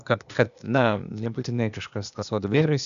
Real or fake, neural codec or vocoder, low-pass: fake; codec, 16 kHz, 0.8 kbps, ZipCodec; 7.2 kHz